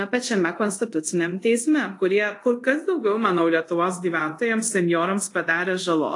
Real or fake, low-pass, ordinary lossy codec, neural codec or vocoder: fake; 10.8 kHz; AAC, 48 kbps; codec, 24 kHz, 0.5 kbps, DualCodec